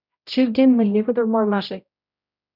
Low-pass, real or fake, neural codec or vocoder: 5.4 kHz; fake; codec, 16 kHz, 0.5 kbps, X-Codec, HuBERT features, trained on general audio